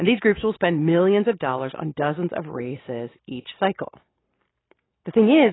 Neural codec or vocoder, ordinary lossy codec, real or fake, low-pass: none; AAC, 16 kbps; real; 7.2 kHz